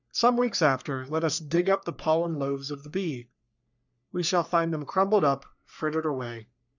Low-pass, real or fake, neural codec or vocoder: 7.2 kHz; fake; codec, 44.1 kHz, 3.4 kbps, Pupu-Codec